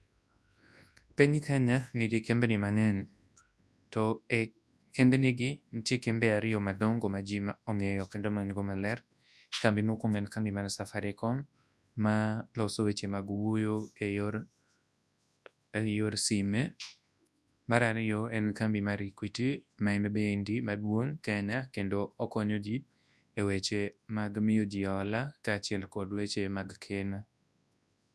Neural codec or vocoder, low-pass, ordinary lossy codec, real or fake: codec, 24 kHz, 0.9 kbps, WavTokenizer, large speech release; none; none; fake